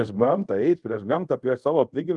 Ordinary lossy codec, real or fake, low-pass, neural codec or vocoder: Opus, 24 kbps; fake; 10.8 kHz; codec, 24 kHz, 0.5 kbps, DualCodec